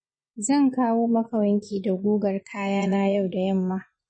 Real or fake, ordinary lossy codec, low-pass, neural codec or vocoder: fake; MP3, 32 kbps; 9.9 kHz; vocoder, 24 kHz, 100 mel bands, Vocos